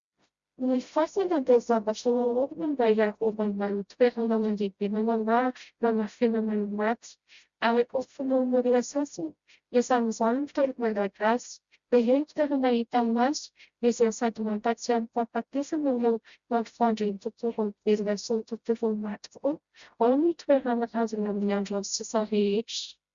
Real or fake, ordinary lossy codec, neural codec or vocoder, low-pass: fake; Opus, 64 kbps; codec, 16 kHz, 0.5 kbps, FreqCodec, smaller model; 7.2 kHz